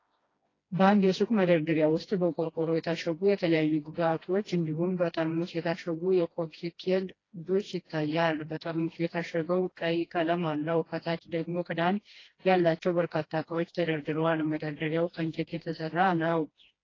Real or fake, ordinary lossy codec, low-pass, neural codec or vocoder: fake; AAC, 32 kbps; 7.2 kHz; codec, 16 kHz, 1 kbps, FreqCodec, smaller model